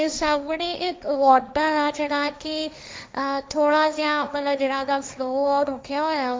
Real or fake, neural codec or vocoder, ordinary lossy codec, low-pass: fake; codec, 16 kHz, 1.1 kbps, Voila-Tokenizer; none; none